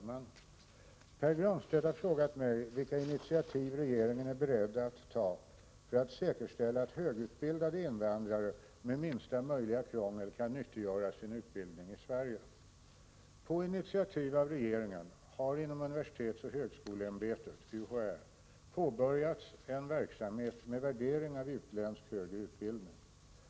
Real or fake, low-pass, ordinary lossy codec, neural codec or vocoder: real; none; none; none